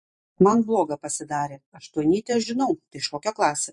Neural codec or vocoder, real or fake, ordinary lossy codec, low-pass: vocoder, 44.1 kHz, 128 mel bands every 512 samples, BigVGAN v2; fake; MP3, 48 kbps; 10.8 kHz